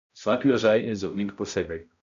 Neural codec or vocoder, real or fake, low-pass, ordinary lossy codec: codec, 16 kHz, 0.5 kbps, X-Codec, HuBERT features, trained on balanced general audio; fake; 7.2 kHz; MP3, 64 kbps